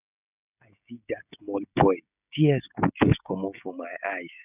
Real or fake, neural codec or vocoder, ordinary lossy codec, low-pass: fake; codec, 16 kHz, 16 kbps, FreqCodec, smaller model; none; 3.6 kHz